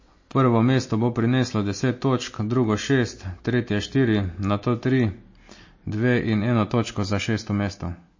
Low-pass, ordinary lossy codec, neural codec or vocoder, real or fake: 7.2 kHz; MP3, 32 kbps; none; real